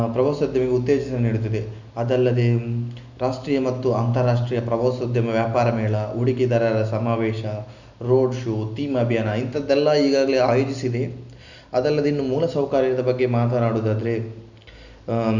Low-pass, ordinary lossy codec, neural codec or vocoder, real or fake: 7.2 kHz; none; none; real